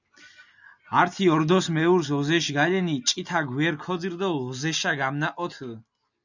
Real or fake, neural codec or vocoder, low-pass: real; none; 7.2 kHz